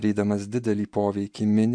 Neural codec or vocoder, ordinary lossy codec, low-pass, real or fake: none; MP3, 64 kbps; 9.9 kHz; real